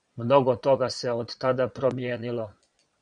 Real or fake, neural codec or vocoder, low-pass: fake; vocoder, 22.05 kHz, 80 mel bands, Vocos; 9.9 kHz